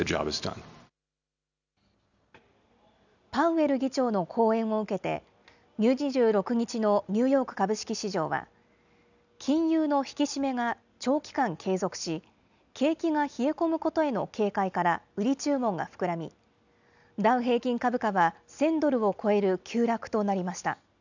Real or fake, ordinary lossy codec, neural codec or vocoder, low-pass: real; none; none; 7.2 kHz